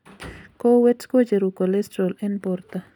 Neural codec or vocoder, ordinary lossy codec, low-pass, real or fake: none; none; 19.8 kHz; real